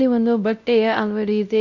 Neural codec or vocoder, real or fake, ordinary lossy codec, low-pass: codec, 16 kHz, 0.5 kbps, X-Codec, WavLM features, trained on Multilingual LibriSpeech; fake; none; 7.2 kHz